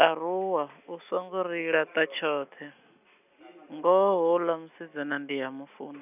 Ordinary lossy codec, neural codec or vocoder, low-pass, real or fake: none; none; 3.6 kHz; real